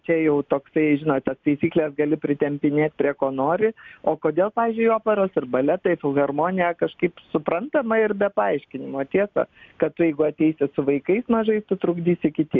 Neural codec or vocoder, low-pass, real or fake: none; 7.2 kHz; real